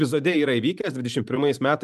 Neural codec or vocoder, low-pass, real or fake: vocoder, 44.1 kHz, 128 mel bands every 512 samples, BigVGAN v2; 14.4 kHz; fake